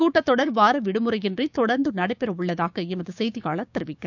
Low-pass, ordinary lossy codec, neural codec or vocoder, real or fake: 7.2 kHz; none; codec, 16 kHz, 6 kbps, DAC; fake